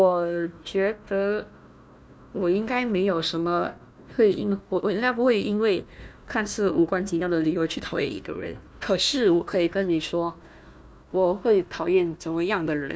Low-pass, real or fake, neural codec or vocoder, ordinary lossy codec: none; fake; codec, 16 kHz, 1 kbps, FunCodec, trained on Chinese and English, 50 frames a second; none